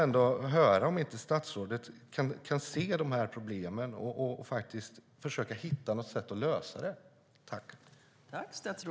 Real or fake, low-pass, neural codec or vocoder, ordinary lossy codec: real; none; none; none